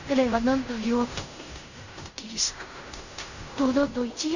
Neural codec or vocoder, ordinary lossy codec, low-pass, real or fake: codec, 16 kHz in and 24 kHz out, 0.4 kbps, LongCat-Audio-Codec, fine tuned four codebook decoder; none; 7.2 kHz; fake